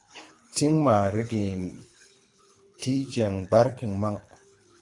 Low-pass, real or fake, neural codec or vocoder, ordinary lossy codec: 10.8 kHz; fake; codec, 24 kHz, 3 kbps, HILCodec; AAC, 48 kbps